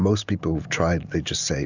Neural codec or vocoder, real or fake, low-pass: none; real; 7.2 kHz